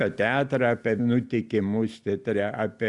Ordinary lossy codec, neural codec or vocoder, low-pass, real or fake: Opus, 64 kbps; none; 10.8 kHz; real